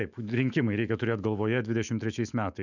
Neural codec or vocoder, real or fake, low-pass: autoencoder, 48 kHz, 128 numbers a frame, DAC-VAE, trained on Japanese speech; fake; 7.2 kHz